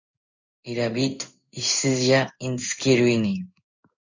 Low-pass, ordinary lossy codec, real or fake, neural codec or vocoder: 7.2 kHz; AAC, 48 kbps; fake; codec, 16 kHz in and 24 kHz out, 1 kbps, XY-Tokenizer